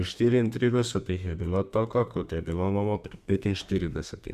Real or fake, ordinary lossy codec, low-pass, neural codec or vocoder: fake; Opus, 64 kbps; 14.4 kHz; codec, 32 kHz, 1.9 kbps, SNAC